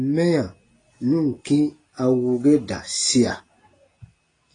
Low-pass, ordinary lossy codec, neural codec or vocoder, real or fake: 9.9 kHz; AAC, 32 kbps; none; real